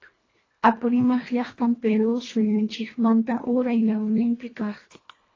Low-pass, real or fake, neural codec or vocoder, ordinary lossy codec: 7.2 kHz; fake; codec, 24 kHz, 1.5 kbps, HILCodec; AAC, 32 kbps